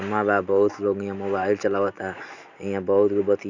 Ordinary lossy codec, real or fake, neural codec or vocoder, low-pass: none; real; none; 7.2 kHz